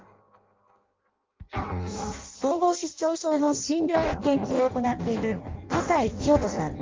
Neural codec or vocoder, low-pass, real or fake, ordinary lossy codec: codec, 16 kHz in and 24 kHz out, 0.6 kbps, FireRedTTS-2 codec; 7.2 kHz; fake; Opus, 24 kbps